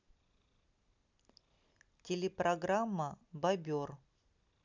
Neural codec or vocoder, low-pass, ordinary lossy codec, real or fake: none; 7.2 kHz; none; real